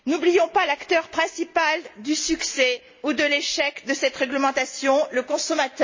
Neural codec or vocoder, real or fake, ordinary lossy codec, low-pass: none; real; MP3, 32 kbps; 7.2 kHz